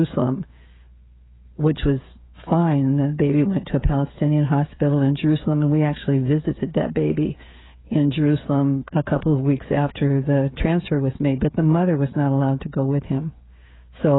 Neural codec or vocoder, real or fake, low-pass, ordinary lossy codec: codec, 16 kHz, 16 kbps, FreqCodec, smaller model; fake; 7.2 kHz; AAC, 16 kbps